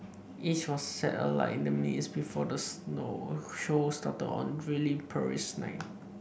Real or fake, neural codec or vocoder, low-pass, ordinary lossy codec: real; none; none; none